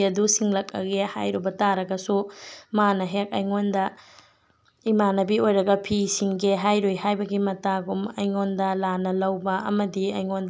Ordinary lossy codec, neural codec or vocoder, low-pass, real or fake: none; none; none; real